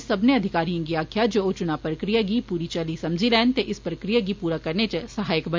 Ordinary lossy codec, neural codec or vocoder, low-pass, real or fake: MP3, 64 kbps; none; 7.2 kHz; real